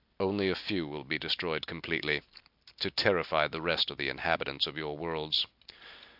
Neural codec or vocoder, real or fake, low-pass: none; real; 5.4 kHz